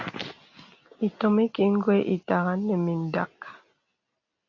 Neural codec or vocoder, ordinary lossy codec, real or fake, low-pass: none; AAC, 48 kbps; real; 7.2 kHz